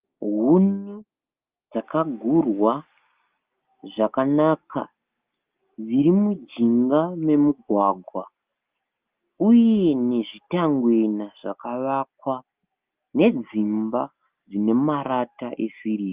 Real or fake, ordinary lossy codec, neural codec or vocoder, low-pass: real; Opus, 32 kbps; none; 3.6 kHz